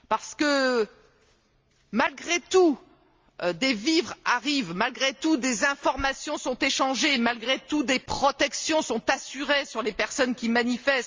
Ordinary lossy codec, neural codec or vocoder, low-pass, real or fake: Opus, 32 kbps; none; 7.2 kHz; real